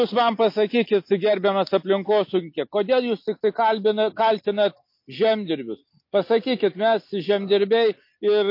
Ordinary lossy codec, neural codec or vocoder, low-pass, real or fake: MP3, 32 kbps; none; 5.4 kHz; real